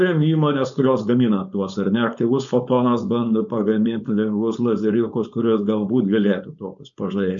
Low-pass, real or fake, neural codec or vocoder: 7.2 kHz; fake; codec, 16 kHz, 4.8 kbps, FACodec